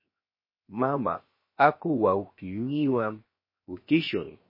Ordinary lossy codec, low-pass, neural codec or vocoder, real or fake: MP3, 24 kbps; 5.4 kHz; codec, 16 kHz, 0.7 kbps, FocalCodec; fake